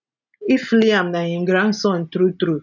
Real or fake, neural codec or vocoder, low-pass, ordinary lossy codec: real; none; 7.2 kHz; none